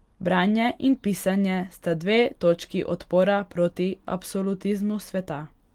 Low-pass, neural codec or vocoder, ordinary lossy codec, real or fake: 19.8 kHz; none; Opus, 24 kbps; real